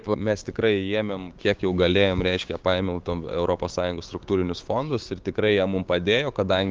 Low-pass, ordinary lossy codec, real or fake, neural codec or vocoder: 7.2 kHz; Opus, 32 kbps; fake; codec, 16 kHz, 6 kbps, DAC